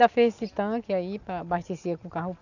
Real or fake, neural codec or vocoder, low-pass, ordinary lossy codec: real; none; 7.2 kHz; none